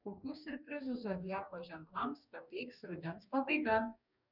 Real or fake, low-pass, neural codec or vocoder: fake; 5.4 kHz; codec, 44.1 kHz, 2.6 kbps, DAC